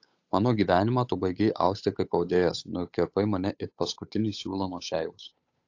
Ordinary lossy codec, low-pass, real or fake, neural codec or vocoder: AAC, 48 kbps; 7.2 kHz; fake; codec, 16 kHz, 8 kbps, FunCodec, trained on Chinese and English, 25 frames a second